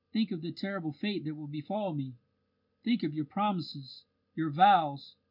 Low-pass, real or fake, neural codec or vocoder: 5.4 kHz; real; none